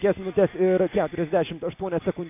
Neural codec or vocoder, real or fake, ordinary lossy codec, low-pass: none; real; AAC, 32 kbps; 3.6 kHz